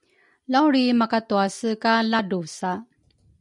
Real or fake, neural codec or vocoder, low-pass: real; none; 10.8 kHz